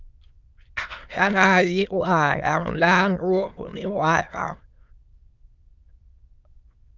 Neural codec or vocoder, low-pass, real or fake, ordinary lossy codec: autoencoder, 22.05 kHz, a latent of 192 numbers a frame, VITS, trained on many speakers; 7.2 kHz; fake; Opus, 24 kbps